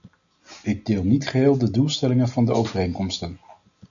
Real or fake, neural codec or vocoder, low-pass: real; none; 7.2 kHz